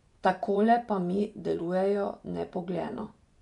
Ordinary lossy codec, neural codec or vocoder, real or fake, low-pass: none; vocoder, 24 kHz, 100 mel bands, Vocos; fake; 10.8 kHz